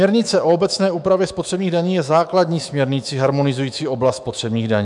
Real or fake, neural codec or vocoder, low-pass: fake; autoencoder, 48 kHz, 128 numbers a frame, DAC-VAE, trained on Japanese speech; 10.8 kHz